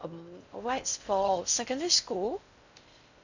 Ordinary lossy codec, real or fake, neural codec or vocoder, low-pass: none; fake; codec, 16 kHz in and 24 kHz out, 0.6 kbps, FocalCodec, streaming, 4096 codes; 7.2 kHz